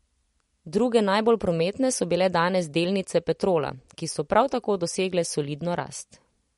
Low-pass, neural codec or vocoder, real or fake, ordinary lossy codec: 19.8 kHz; none; real; MP3, 48 kbps